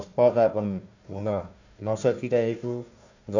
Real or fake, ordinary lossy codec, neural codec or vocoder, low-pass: fake; none; codec, 16 kHz, 1 kbps, FunCodec, trained on Chinese and English, 50 frames a second; 7.2 kHz